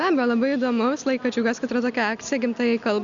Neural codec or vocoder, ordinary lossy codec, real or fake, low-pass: none; Opus, 64 kbps; real; 7.2 kHz